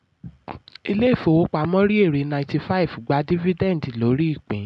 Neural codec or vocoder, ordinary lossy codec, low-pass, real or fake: none; none; none; real